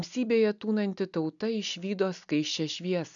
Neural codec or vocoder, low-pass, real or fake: none; 7.2 kHz; real